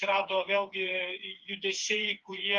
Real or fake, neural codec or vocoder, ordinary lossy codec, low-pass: fake; codec, 16 kHz, 4 kbps, FreqCodec, smaller model; Opus, 32 kbps; 7.2 kHz